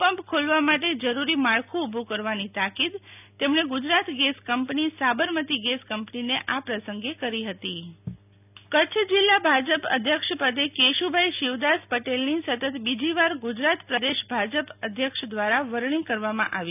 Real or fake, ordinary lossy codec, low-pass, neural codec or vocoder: real; none; 3.6 kHz; none